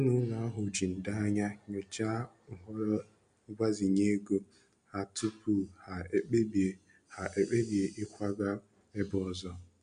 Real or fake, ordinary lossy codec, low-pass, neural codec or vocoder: real; MP3, 64 kbps; 9.9 kHz; none